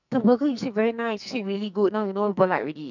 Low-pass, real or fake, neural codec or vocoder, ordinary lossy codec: 7.2 kHz; fake; codec, 44.1 kHz, 2.6 kbps, SNAC; none